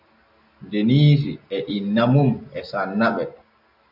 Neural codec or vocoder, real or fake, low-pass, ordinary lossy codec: none; real; 5.4 kHz; AAC, 48 kbps